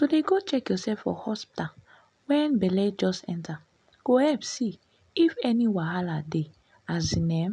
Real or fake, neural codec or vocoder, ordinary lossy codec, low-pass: real; none; none; 9.9 kHz